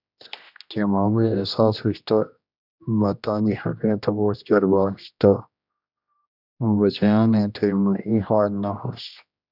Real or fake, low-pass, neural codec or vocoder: fake; 5.4 kHz; codec, 16 kHz, 1 kbps, X-Codec, HuBERT features, trained on general audio